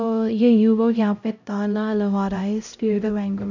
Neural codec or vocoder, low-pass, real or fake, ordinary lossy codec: codec, 16 kHz, 0.5 kbps, X-Codec, HuBERT features, trained on LibriSpeech; 7.2 kHz; fake; none